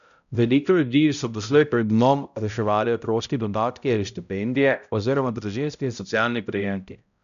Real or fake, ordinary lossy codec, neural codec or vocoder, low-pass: fake; none; codec, 16 kHz, 0.5 kbps, X-Codec, HuBERT features, trained on balanced general audio; 7.2 kHz